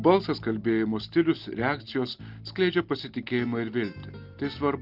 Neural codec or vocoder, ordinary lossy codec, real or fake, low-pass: none; Opus, 32 kbps; real; 5.4 kHz